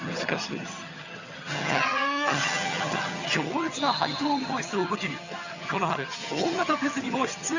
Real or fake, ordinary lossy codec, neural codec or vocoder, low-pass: fake; Opus, 64 kbps; vocoder, 22.05 kHz, 80 mel bands, HiFi-GAN; 7.2 kHz